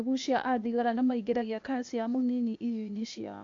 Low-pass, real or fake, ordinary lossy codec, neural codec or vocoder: 7.2 kHz; fake; AAC, 48 kbps; codec, 16 kHz, 0.8 kbps, ZipCodec